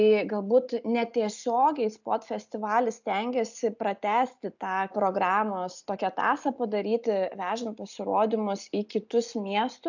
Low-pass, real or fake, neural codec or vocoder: 7.2 kHz; real; none